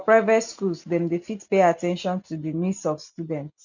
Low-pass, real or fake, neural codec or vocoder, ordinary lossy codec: 7.2 kHz; real; none; none